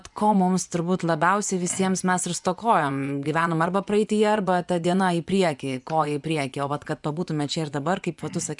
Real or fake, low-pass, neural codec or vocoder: fake; 10.8 kHz; vocoder, 24 kHz, 100 mel bands, Vocos